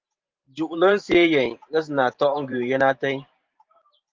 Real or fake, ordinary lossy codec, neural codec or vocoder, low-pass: fake; Opus, 32 kbps; vocoder, 44.1 kHz, 128 mel bands every 512 samples, BigVGAN v2; 7.2 kHz